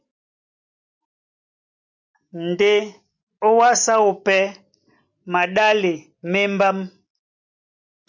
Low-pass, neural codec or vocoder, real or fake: 7.2 kHz; none; real